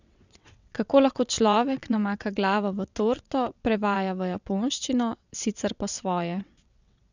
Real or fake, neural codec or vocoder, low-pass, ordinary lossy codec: fake; vocoder, 22.05 kHz, 80 mel bands, WaveNeXt; 7.2 kHz; none